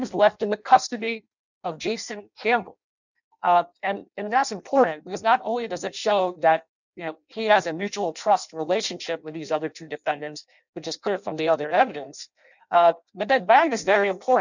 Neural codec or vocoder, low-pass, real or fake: codec, 16 kHz in and 24 kHz out, 0.6 kbps, FireRedTTS-2 codec; 7.2 kHz; fake